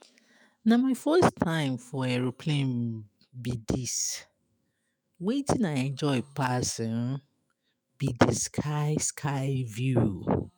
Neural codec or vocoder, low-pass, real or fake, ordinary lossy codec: autoencoder, 48 kHz, 128 numbers a frame, DAC-VAE, trained on Japanese speech; none; fake; none